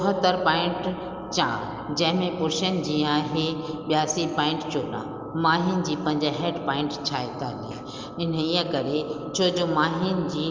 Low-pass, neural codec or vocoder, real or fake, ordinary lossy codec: 7.2 kHz; none; real; Opus, 24 kbps